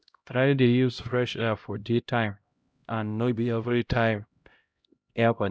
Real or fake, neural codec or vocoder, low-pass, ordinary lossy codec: fake; codec, 16 kHz, 0.5 kbps, X-Codec, HuBERT features, trained on LibriSpeech; none; none